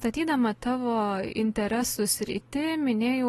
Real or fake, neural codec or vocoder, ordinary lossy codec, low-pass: real; none; AAC, 32 kbps; 19.8 kHz